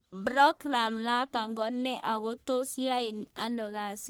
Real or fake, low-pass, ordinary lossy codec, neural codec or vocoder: fake; none; none; codec, 44.1 kHz, 1.7 kbps, Pupu-Codec